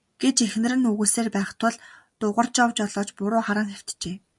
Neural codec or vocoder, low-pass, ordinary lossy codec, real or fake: none; 10.8 kHz; MP3, 96 kbps; real